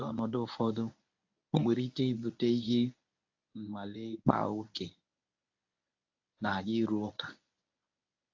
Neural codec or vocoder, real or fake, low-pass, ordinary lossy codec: codec, 24 kHz, 0.9 kbps, WavTokenizer, medium speech release version 1; fake; 7.2 kHz; AAC, 48 kbps